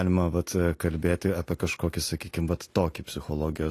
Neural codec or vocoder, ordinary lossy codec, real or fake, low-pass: none; AAC, 48 kbps; real; 14.4 kHz